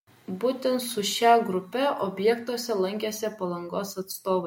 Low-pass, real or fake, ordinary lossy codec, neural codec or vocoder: 19.8 kHz; real; MP3, 64 kbps; none